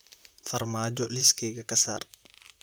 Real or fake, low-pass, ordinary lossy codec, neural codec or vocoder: fake; none; none; vocoder, 44.1 kHz, 128 mel bands, Pupu-Vocoder